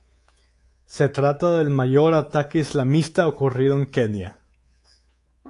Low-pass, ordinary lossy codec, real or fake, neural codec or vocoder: 10.8 kHz; AAC, 48 kbps; fake; codec, 24 kHz, 3.1 kbps, DualCodec